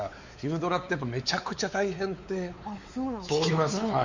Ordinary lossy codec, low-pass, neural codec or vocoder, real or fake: Opus, 64 kbps; 7.2 kHz; codec, 16 kHz, 4 kbps, X-Codec, WavLM features, trained on Multilingual LibriSpeech; fake